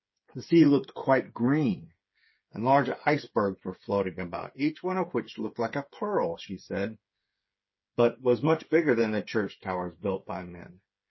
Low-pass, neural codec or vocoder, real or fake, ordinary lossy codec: 7.2 kHz; codec, 16 kHz, 8 kbps, FreqCodec, smaller model; fake; MP3, 24 kbps